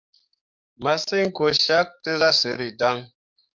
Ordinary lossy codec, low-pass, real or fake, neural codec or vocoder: MP3, 64 kbps; 7.2 kHz; fake; codec, 44.1 kHz, 7.8 kbps, DAC